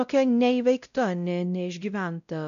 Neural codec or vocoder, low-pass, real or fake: codec, 16 kHz, 0.5 kbps, X-Codec, WavLM features, trained on Multilingual LibriSpeech; 7.2 kHz; fake